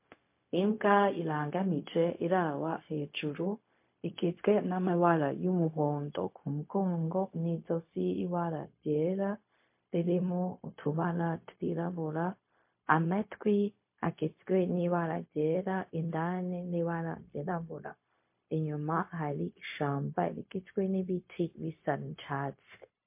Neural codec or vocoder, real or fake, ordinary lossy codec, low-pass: codec, 16 kHz, 0.4 kbps, LongCat-Audio-Codec; fake; MP3, 24 kbps; 3.6 kHz